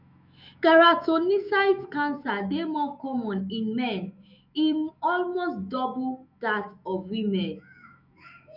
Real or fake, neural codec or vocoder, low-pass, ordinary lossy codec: fake; autoencoder, 48 kHz, 128 numbers a frame, DAC-VAE, trained on Japanese speech; 5.4 kHz; none